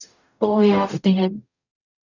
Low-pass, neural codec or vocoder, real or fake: 7.2 kHz; codec, 44.1 kHz, 0.9 kbps, DAC; fake